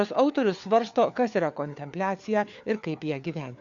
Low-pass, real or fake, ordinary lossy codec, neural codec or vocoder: 7.2 kHz; fake; Opus, 64 kbps; codec, 16 kHz, 4 kbps, FunCodec, trained on LibriTTS, 50 frames a second